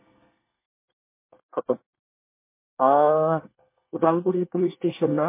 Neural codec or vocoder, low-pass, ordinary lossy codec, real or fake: codec, 24 kHz, 1 kbps, SNAC; 3.6 kHz; MP3, 24 kbps; fake